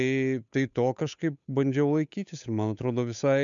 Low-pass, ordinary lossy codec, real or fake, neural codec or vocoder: 7.2 kHz; MP3, 96 kbps; fake; codec, 16 kHz, 8 kbps, FunCodec, trained on Chinese and English, 25 frames a second